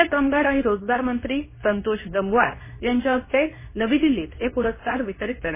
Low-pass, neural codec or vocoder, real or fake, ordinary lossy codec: 3.6 kHz; codec, 24 kHz, 0.9 kbps, WavTokenizer, medium speech release version 2; fake; MP3, 16 kbps